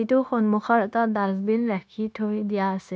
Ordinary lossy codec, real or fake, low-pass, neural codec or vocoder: none; fake; none; codec, 16 kHz, 0.9 kbps, LongCat-Audio-Codec